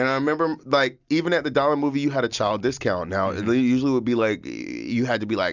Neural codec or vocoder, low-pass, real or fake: none; 7.2 kHz; real